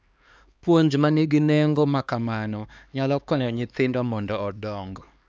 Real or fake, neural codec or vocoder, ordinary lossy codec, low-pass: fake; codec, 16 kHz, 2 kbps, X-Codec, HuBERT features, trained on LibriSpeech; none; none